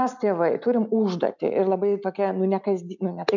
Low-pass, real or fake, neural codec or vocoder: 7.2 kHz; real; none